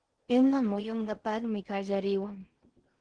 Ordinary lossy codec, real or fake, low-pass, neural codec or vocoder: Opus, 16 kbps; fake; 9.9 kHz; codec, 16 kHz in and 24 kHz out, 0.6 kbps, FocalCodec, streaming, 4096 codes